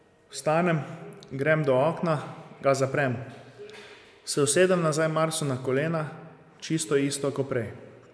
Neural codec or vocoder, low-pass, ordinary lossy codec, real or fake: none; none; none; real